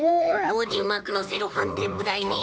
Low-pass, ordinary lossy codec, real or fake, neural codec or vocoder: none; none; fake; codec, 16 kHz, 2 kbps, X-Codec, HuBERT features, trained on LibriSpeech